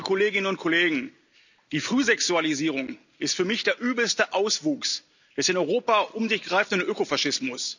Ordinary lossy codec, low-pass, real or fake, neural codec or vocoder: none; 7.2 kHz; real; none